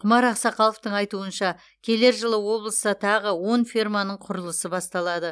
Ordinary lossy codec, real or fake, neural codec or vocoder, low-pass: none; real; none; none